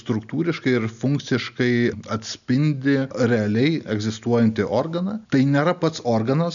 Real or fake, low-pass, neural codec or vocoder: real; 7.2 kHz; none